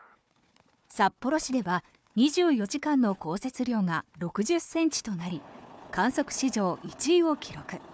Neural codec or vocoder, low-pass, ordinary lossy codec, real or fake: codec, 16 kHz, 4 kbps, FunCodec, trained on Chinese and English, 50 frames a second; none; none; fake